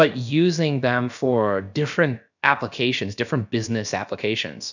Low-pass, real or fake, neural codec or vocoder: 7.2 kHz; fake; codec, 16 kHz, about 1 kbps, DyCAST, with the encoder's durations